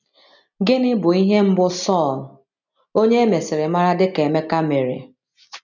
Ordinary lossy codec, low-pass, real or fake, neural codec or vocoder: none; 7.2 kHz; real; none